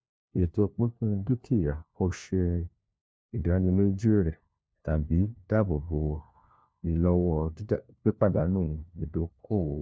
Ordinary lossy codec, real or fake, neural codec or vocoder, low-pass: none; fake; codec, 16 kHz, 1 kbps, FunCodec, trained on LibriTTS, 50 frames a second; none